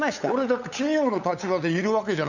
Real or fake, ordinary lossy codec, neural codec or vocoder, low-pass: fake; none; codec, 16 kHz, 8 kbps, FunCodec, trained on LibriTTS, 25 frames a second; 7.2 kHz